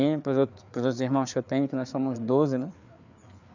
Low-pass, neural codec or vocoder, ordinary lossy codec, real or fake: 7.2 kHz; codec, 16 kHz, 4 kbps, FreqCodec, larger model; none; fake